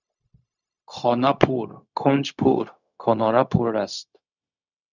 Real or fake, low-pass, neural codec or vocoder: fake; 7.2 kHz; codec, 16 kHz, 0.4 kbps, LongCat-Audio-Codec